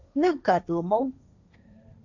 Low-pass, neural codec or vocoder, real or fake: 7.2 kHz; codec, 16 kHz, 1.1 kbps, Voila-Tokenizer; fake